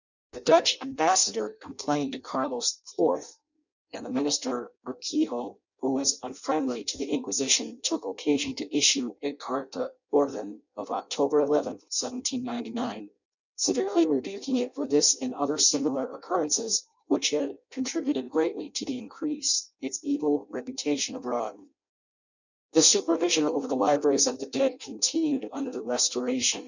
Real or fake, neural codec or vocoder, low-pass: fake; codec, 16 kHz in and 24 kHz out, 0.6 kbps, FireRedTTS-2 codec; 7.2 kHz